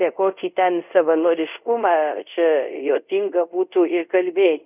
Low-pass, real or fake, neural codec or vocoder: 3.6 kHz; fake; codec, 24 kHz, 0.5 kbps, DualCodec